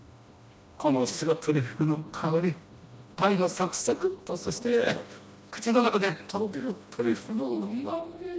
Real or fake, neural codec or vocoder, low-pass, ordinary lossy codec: fake; codec, 16 kHz, 1 kbps, FreqCodec, smaller model; none; none